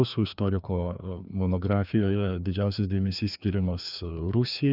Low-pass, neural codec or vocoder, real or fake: 5.4 kHz; codec, 16 kHz, 2 kbps, FreqCodec, larger model; fake